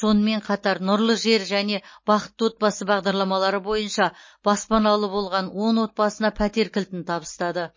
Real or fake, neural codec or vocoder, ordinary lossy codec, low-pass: real; none; MP3, 32 kbps; 7.2 kHz